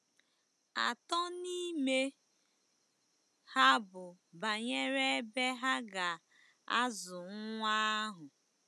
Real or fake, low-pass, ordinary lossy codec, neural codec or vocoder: real; none; none; none